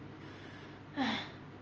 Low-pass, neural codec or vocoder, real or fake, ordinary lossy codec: 7.2 kHz; none; real; Opus, 24 kbps